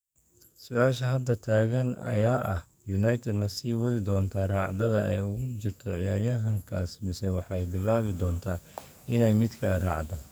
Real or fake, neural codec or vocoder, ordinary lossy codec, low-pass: fake; codec, 44.1 kHz, 2.6 kbps, SNAC; none; none